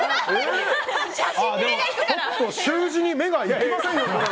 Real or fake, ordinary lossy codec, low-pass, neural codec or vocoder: real; none; none; none